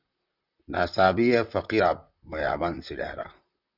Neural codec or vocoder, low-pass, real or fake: vocoder, 44.1 kHz, 128 mel bands, Pupu-Vocoder; 5.4 kHz; fake